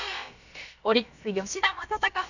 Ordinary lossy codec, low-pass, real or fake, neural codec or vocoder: none; 7.2 kHz; fake; codec, 16 kHz, about 1 kbps, DyCAST, with the encoder's durations